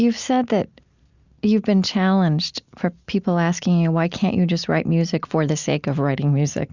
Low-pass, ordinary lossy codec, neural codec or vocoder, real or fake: 7.2 kHz; Opus, 64 kbps; none; real